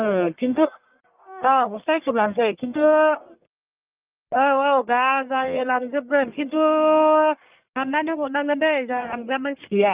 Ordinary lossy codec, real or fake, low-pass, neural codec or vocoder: Opus, 32 kbps; fake; 3.6 kHz; codec, 44.1 kHz, 1.7 kbps, Pupu-Codec